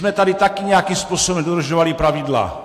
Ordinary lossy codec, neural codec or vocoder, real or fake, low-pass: AAC, 64 kbps; none; real; 14.4 kHz